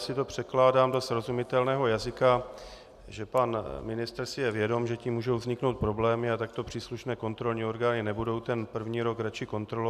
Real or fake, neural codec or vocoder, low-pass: real; none; 14.4 kHz